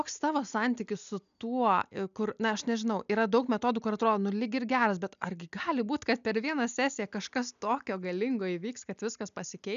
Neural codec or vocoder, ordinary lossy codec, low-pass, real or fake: none; AAC, 96 kbps; 7.2 kHz; real